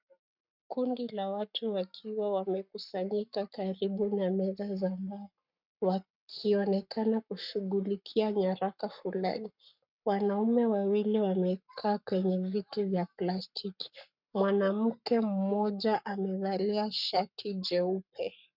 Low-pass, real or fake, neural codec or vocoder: 5.4 kHz; fake; codec, 44.1 kHz, 7.8 kbps, Pupu-Codec